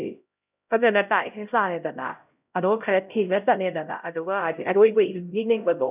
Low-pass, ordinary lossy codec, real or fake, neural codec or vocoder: 3.6 kHz; none; fake; codec, 16 kHz, 0.5 kbps, X-Codec, HuBERT features, trained on LibriSpeech